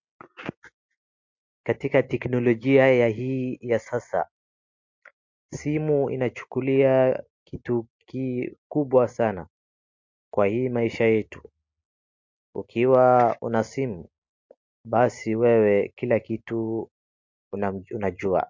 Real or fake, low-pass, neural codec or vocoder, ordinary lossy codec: real; 7.2 kHz; none; MP3, 48 kbps